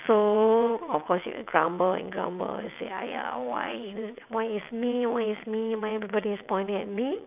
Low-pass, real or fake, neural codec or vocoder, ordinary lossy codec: 3.6 kHz; fake; vocoder, 22.05 kHz, 80 mel bands, WaveNeXt; none